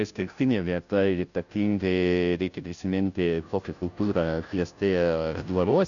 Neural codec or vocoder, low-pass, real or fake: codec, 16 kHz, 0.5 kbps, FunCodec, trained on Chinese and English, 25 frames a second; 7.2 kHz; fake